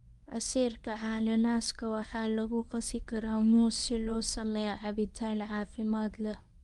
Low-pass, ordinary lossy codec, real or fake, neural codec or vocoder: 10.8 kHz; Opus, 32 kbps; fake; codec, 24 kHz, 0.9 kbps, WavTokenizer, medium speech release version 1